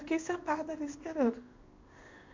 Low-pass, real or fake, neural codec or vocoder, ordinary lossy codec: 7.2 kHz; fake; codec, 16 kHz in and 24 kHz out, 1 kbps, XY-Tokenizer; MP3, 48 kbps